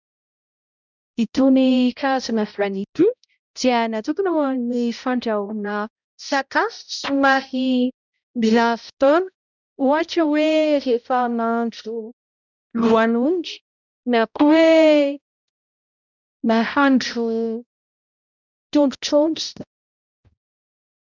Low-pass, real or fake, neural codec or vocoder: 7.2 kHz; fake; codec, 16 kHz, 0.5 kbps, X-Codec, HuBERT features, trained on balanced general audio